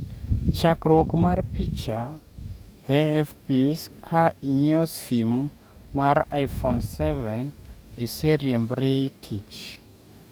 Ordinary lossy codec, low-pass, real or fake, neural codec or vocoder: none; none; fake; codec, 44.1 kHz, 2.6 kbps, DAC